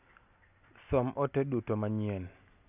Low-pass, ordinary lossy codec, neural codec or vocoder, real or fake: 3.6 kHz; none; none; real